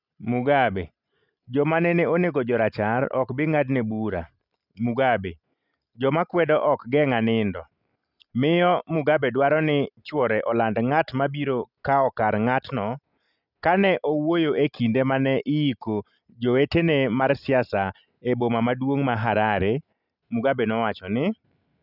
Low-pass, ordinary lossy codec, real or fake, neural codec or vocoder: 5.4 kHz; none; real; none